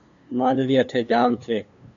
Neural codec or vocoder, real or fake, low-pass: codec, 16 kHz, 2 kbps, FunCodec, trained on LibriTTS, 25 frames a second; fake; 7.2 kHz